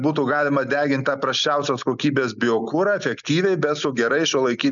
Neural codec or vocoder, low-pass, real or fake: none; 7.2 kHz; real